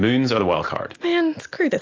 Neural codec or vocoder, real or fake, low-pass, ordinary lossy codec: none; real; 7.2 kHz; AAC, 48 kbps